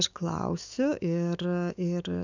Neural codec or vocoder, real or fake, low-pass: autoencoder, 48 kHz, 128 numbers a frame, DAC-VAE, trained on Japanese speech; fake; 7.2 kHz